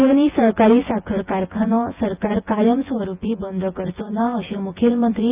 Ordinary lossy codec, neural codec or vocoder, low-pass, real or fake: Opus, 24 kbps; vocoder, 24 kHz, 100 mel bands, Vocos; 3.6 kHz; fake